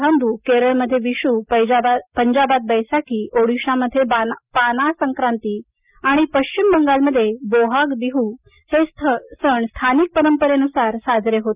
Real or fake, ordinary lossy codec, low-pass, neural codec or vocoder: real; Opus, 64 kbps; 3.6 kHz; none